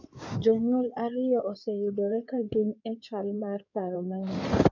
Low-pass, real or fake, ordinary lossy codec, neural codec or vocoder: 7.2 kHz; fake; none; codec, 16 kHz in and 24 kHz out, 2.2 kbps, FireRedTTS-2 codec